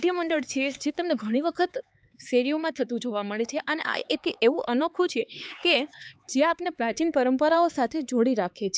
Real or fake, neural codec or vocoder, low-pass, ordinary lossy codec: fake; codec, 16 kHz, 4 kbps, X-Codec, HuBERT features, trained on LibriSpeech; none; none